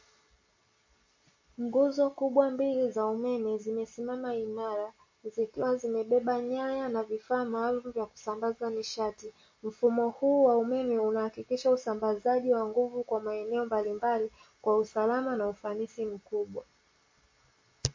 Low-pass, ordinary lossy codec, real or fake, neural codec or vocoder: 7.2 kHz; MP3, 32 kbps; real; none